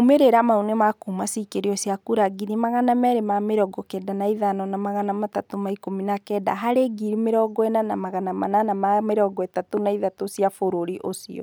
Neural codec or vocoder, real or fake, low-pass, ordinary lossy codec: none; real; none; none